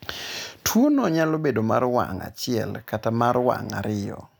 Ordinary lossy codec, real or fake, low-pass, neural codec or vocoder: none; real; none; none